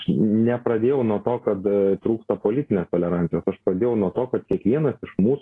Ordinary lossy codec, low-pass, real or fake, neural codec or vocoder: AAC, 32 kbps; 10.8 kHz; real; none